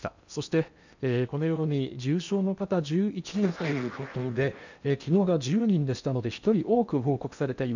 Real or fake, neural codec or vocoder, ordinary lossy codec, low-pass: fake; codec, 16 kHz in and 24 kHz out, 0.8 kbps, FocalCodec, streaming, 65536 codes; none; 7.2 kHz